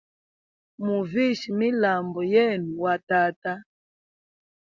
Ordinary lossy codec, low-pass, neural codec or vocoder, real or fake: Opus, 64 kbps; 7.2 kHz; none; real